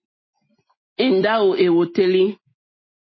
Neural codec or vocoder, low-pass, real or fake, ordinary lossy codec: none; 7.2 kHz; real; MP3, 24 kbps